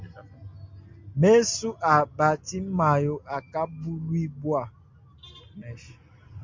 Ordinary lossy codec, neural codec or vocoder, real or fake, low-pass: MP3, 48 kbps; none; real; 7.2 kHz